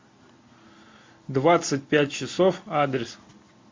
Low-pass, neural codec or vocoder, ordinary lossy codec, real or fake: 7.2 kHz; none; MP3, 48 kbps; real